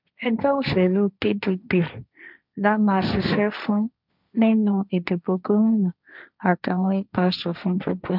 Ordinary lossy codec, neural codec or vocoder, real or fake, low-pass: none; codec, 16 kHz, 1.1 kbps, Voila-Tokenizer; fake; 5.4 kHz